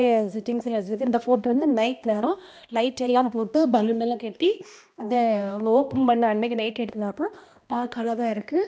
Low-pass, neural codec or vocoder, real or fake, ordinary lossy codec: none; codec, 16 kHz, 1 kbps, X-Codec, HuBERT features, trained on balanced general audio; fake; none